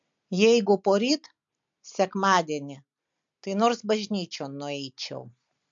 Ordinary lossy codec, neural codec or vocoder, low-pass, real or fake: MP3, 48 kbps; none; 7.2 kHz; real